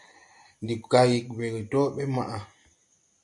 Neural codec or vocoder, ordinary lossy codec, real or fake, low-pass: none; MP3, 64 kbps; real; 10.8 kHz